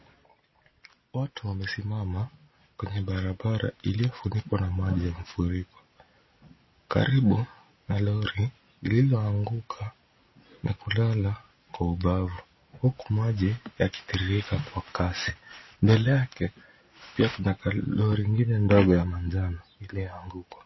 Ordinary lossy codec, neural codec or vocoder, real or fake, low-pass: MP3, 24 kbps; none; real; 7.2 kHz